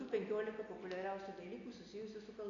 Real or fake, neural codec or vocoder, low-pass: real; none; 7.2 kHz